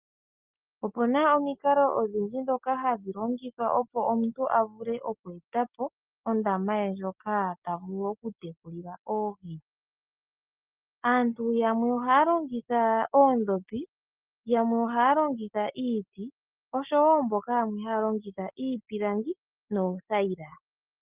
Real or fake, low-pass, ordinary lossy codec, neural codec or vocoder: real; 3.6 kHz; Opus, 32 kbps; none